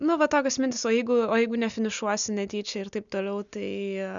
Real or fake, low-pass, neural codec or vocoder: real; 7.2 kHz; none